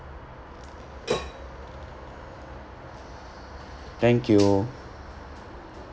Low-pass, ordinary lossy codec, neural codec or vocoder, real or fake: none; none; none; real